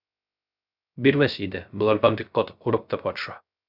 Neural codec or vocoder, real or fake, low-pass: codec, 16 kHz, 0.3 kbps, FocalCodec; fake; 5.4 kHz